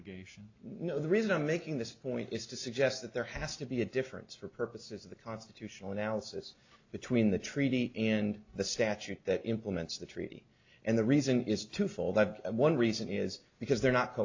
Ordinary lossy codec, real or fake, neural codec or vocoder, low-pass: AAC, 48 kbps; real; none; 7.2 kHz